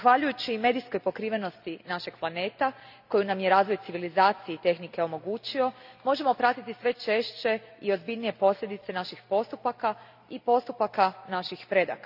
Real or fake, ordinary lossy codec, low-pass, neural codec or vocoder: real; none; 5.4 kHz; none